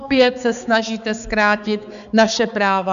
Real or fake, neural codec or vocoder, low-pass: fake; codec, 16 kHz, 4 kbps, X-Codec, HuBERT features, trained on balanced general audio; 7.2 kHz